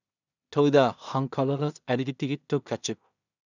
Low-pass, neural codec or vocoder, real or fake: 7.2 kHz; codec, 16 kHz in and 24 kHz out, 0.4 kbps, LongCat-Audio-Codec, two codebook decoder; fake